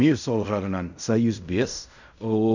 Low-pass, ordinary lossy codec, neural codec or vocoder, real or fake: 7.2 kHz; none; codec, 16 kHz in and 24 kHz out, 0.4 kbps, LongCat-Audio-Codec, fine tuned four codebook decoder; fake